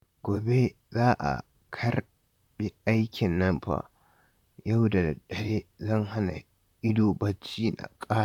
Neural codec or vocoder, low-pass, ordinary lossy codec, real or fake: vocoder, 44.1 kHz, 128 mel bands, Pupu-Vocoder; 19.8 kHz; none; fake